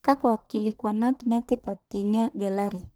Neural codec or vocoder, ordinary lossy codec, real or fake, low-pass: codec, 44.1 kHz, 1.7 kbps, Pupu-Codec; none; fake; none